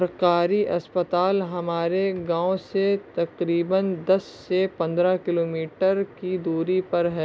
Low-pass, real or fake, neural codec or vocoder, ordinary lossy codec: none; real; none; none